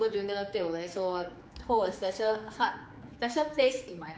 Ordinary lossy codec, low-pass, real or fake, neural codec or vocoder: none; none; fake; codec, 16 kHz, 4 kbps, X-Codec, HuBERT features, trained on general audio